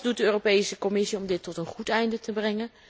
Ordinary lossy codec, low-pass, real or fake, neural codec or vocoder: none; none; real; none